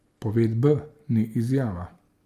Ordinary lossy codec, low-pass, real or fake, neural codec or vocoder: Opus, 32 kbps; 14.4 kHz; real; none